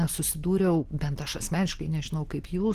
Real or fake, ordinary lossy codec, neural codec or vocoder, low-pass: real; Opus, 16 kbps; none; 14.4 kHz